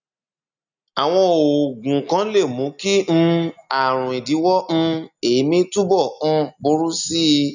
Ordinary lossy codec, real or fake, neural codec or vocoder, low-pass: none; real; none; 7.2 kHz